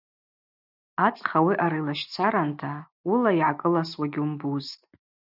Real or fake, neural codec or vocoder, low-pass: real; none; 5.4 kHz